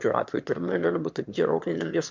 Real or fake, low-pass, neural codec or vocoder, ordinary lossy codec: fake; 7.2 kHz; autoencoder, 22.05 kHz, a latent of 192 numbers a frame, VITS, trained on one speaker; MP3, 64 kbps